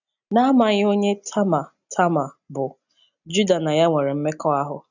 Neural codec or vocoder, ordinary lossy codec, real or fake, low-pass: none; none; real; 7.2 kHz